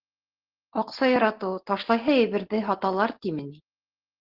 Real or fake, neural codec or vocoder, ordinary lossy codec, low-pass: real; none; Opus, 16 kbps; 5.4 kHz